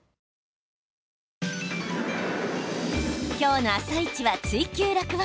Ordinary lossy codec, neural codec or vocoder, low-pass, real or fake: none; none; none; real